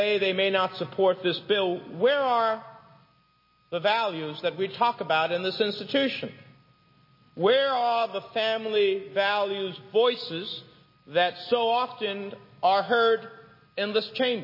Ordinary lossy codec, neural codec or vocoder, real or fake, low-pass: MP3, 24 kbps; none; real; 5.4 kHz